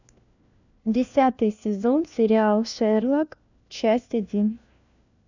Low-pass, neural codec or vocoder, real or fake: 7.2 kHz; codec, 16 kHz, 1 kbps, FunCodec, trained on LibriTTS, 50 frames a second; fake